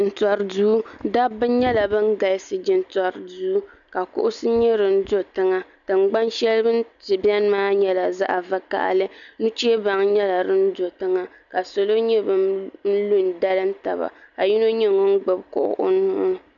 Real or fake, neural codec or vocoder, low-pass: real; none; 7.2 kHz